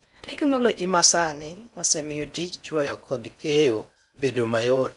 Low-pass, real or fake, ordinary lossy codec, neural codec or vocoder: 10.8 kHz; fake; none; codec, 16 kHz in and 24 kHz out, 0.6 kbps, FocalCodec, streaming, 4096 codes